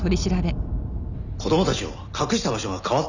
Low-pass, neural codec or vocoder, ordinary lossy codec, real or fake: 7.2 kHz; none; none; real